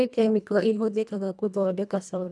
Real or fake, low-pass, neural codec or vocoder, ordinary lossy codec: fake; none; codec, 24 kHz, 1.5 kbps, HILCodec; none